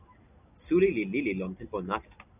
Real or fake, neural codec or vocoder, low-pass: real; none; 3.6 kHz